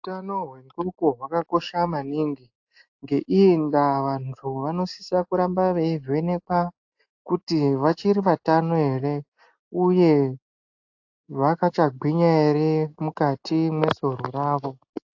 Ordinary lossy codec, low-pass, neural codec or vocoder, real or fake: AAC, 48 kbps; 7.2 kHz; none; real